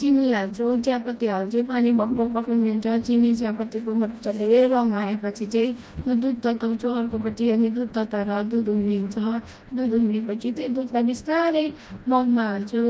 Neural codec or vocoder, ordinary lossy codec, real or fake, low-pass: codec, 16 kHz, 1 kbps, FreqCodec, smaller model; none; fake; none